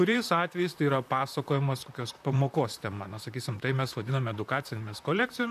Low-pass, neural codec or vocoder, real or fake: 14.4 kHz; vocoder, 44.1 kHz, 128 mel bands, Pupu-Vocoder; fake